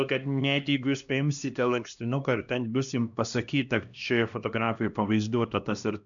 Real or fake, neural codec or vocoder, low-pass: fake; codec, 16 kHz, 1 kbps, X-Codec, HuBERT features, trained on LibriSpeech; 7.2 kHz